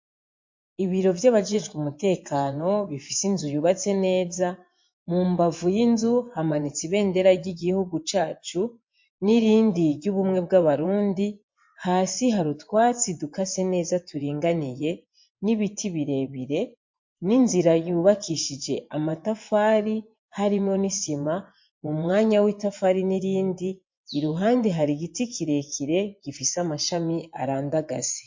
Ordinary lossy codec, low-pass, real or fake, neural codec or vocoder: MP3, 48 kbps; 7.2 kHz; fake; vocoder, 24 kHz, 100 mel bands, Vocos